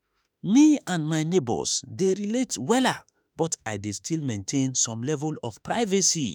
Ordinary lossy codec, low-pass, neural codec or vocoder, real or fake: none; none; autoencoder, 48 kHz, 32 numbers a frame, DAC-VAE, trained on Japanese speech; fake